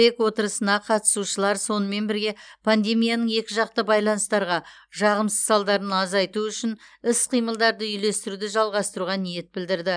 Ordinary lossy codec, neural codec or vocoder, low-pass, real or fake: none; none; 9.9 kHz; real